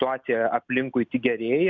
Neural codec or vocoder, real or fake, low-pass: none; real; 7.2 kHz